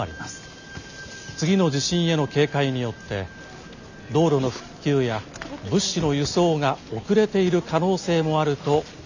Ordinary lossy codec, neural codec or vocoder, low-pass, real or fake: none; none; 7.2 kHz; real